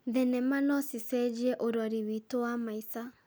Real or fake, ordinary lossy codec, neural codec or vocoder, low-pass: real; none; none; none